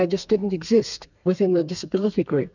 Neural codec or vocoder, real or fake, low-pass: codec, 32 kHz, 1.9 kbps, SNAC; fake; 7.2 kHz